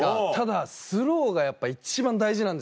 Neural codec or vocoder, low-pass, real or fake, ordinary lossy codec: none; none; real; none